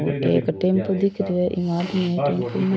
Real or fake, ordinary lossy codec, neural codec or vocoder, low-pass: real; none; none; none